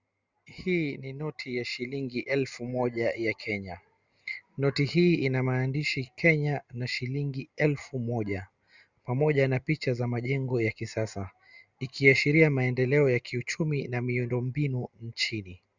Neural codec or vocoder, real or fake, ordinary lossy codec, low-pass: none; real; Opus, 64 kbps; 7.2 kHz